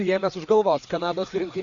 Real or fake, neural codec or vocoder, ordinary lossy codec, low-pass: fake; codec, 16 kHz, 4 kbps, FreqCodec, larger model; Opus, 64 kbps; 7.2 kHz